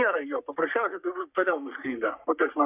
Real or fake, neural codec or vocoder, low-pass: fake; codec, 44.1 kHz, 3.4 kbps, Pupu-Codec; 3.6 kHz